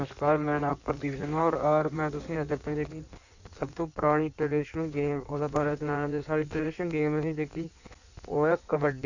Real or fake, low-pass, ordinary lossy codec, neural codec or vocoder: fake; 7.2 kHz; none; codec, 16 kHz in and 24 kHz out, 1.1 kbps, FireRedTTS-2 codec